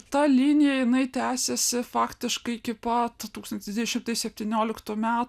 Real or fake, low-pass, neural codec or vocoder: real; 14.4 kHz; none